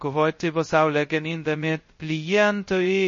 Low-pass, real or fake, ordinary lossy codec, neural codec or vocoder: 7.2 kHz; fake; MP3, 32 kbps; codec, 16 kHz, 0.2 kbps, FocalCodec